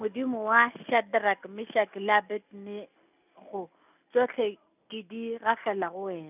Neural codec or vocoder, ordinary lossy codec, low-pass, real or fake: none; none; 3.6 kHz; real